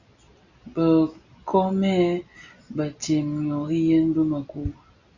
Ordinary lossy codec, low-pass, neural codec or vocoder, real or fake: Opus, 64 kbps; 7.2 kHz; none; real